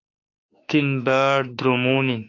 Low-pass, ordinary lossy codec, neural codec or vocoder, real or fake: 7.2 kHz; AAC, 48 kbps; autoencoder, 48 kHz, 32 numbers a frame, DAC-VAE, trained on Japanese speech; fake